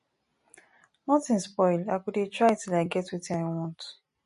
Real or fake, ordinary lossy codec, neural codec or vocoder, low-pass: real; MP3, 48 kbps; none; 10.8 kHz